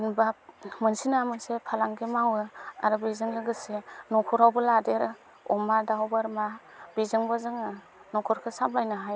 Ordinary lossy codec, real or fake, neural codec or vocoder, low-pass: none; real; none; none